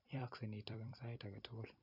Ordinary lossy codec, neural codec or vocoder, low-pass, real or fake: none; vocoder, 44.1 kHz, 128 mel bands every 256 samples, BigVGAN v2; 5.4 kHz; fake